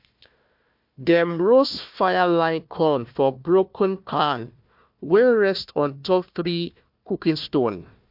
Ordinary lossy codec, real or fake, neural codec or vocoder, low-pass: MP3, 48 kbps; fake; codec, 16 kHz, 1 kbps, FunCodec, trained on Chinese and English, 50 frames a second; 5.4 kHz